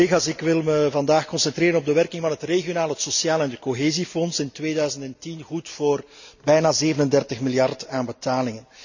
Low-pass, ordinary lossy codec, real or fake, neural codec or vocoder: 7.2 kHz; none; real; none